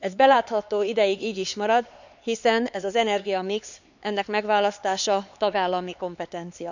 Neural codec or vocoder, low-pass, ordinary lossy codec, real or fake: codec, 16 kHz, 4 kbps, X-Codec, HuBERT features, trained on LibriSpeech; 7.2 kHz; none; fake